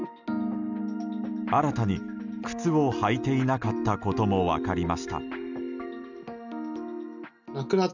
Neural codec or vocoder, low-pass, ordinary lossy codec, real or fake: none; 7.2 kHz; none; real